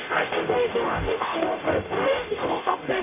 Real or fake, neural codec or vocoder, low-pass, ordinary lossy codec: fake; codec, 44.1 kHz, 0.9 kbps, DAC; 3.6 kHz; none